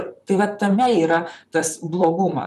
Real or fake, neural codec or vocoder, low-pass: fake; vocoder, 44.1 kHz, 128 mel bands, Pupu-Vocoder; 14.4 kHz